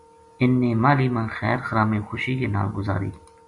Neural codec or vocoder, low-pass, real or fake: none; 10.8 kHz; real